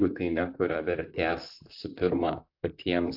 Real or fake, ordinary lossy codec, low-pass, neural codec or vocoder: fake; AAC, 48 kbps; 5.4 kHz; vocoder, 44.1 kHz, 128 mel bands, Pupu-Vocoder